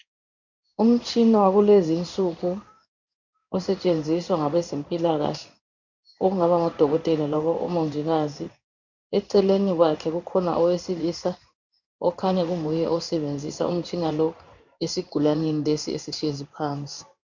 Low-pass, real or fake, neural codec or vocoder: 7.2 kHz; fake; codec, 16 kHz in and 24 kHz out, 1 kbps, XY-Tokenizer